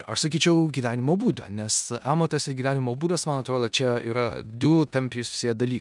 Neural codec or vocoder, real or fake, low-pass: codec, 16 kHz in and 24 kHz out, 0.9 kbps, LongCat-Audio-Codec, four codebook decoder; fake; 10.8 kHz